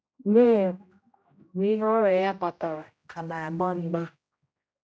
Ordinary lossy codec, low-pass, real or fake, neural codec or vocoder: none; none; fake; codec, 16 kHz, 0.5 kbps, X-Codec, HuBERT features, trained on general audio